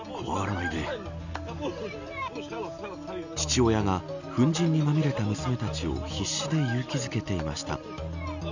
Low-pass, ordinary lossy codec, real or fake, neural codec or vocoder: 7.2 kHz; none; real; none